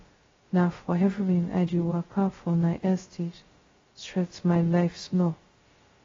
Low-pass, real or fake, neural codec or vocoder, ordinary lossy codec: 7.2 kHz; fake; codec, 16 kHz, 0.2 kbps, FocalCodec; AAC, 24 kbps